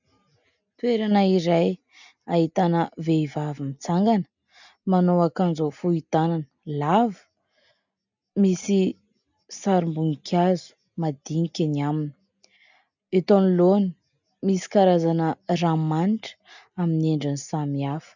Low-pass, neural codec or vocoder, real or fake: 7.2 kHz; none; real